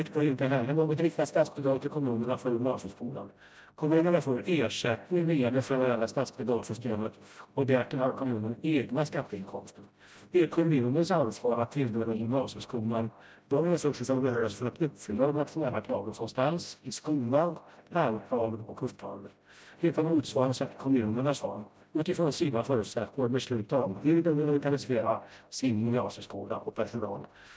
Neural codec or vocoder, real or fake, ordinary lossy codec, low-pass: codec, 16 kHz, 0.5 kbps, FreqCodec, smaller model; fake; none; none